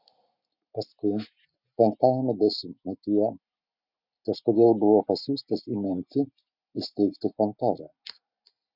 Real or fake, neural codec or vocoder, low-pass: real; none; 5.4 kHz